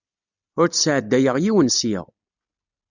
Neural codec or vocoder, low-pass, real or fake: none; 7.2 kHz; real